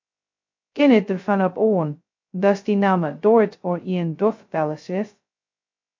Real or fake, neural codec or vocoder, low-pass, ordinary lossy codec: fake; codec, 16 kHz, 0.2 kbps, FocalCodec; 7.2 kHz; MP3, 48 kbps